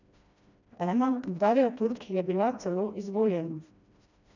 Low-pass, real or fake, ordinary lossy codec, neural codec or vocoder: 7.2 kHz; fake; none; codec, 16 kHz, 1 kbps, FreqCodec, smaller model